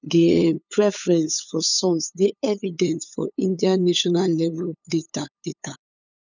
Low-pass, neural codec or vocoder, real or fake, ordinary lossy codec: 7.2 kHz; codec, 16 kHz, 8 kbps, FunCodec, trained on LibriTTS, 25 frames a second; fake; none